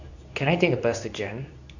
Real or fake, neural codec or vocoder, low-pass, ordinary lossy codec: fake; codec, 24 kHz, 0.9 kbps, WavTokenizer, medium speech release version 2; 7.2 kHz; none